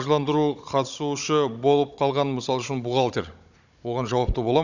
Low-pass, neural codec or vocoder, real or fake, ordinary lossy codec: 7.2 kHz; codec, 16 kHz, 16 kbps, FunCodec, trained on Chinese and English, 50 frames a second; fake; none